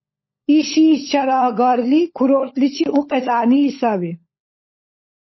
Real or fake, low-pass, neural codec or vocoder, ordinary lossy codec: fake; 7.2 kHz; codec, 16 kHz, 16 kbps, FunCodec, trained on LibriTTS, 50 frames a second; MP3, 24 kbps